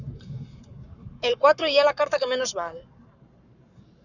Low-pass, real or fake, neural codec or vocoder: 7.2 kHz; fake; codec, 44.1 kHz, 7.8 kbps, Pupu-Codec